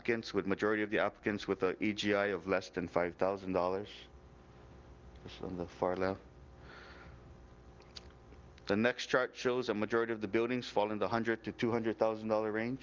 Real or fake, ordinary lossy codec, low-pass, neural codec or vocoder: real; Opus, 32 kbps; 7.2 kHz; none